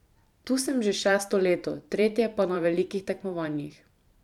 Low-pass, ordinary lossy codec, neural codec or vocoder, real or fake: 19.8 kHz; none; vocoder, 44.1 kHz, 128 mel bands every 512 samples, BigVGAN v2; fake